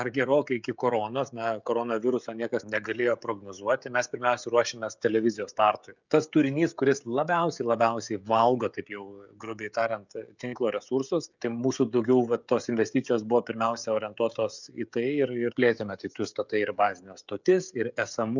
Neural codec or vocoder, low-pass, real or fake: codec, 16 kHz, 16 kbps, FreqCodec, smaller model; 7.2 kHz; fake